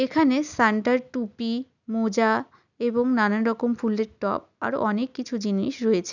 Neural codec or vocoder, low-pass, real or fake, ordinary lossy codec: none; 7.2 kHz; real; none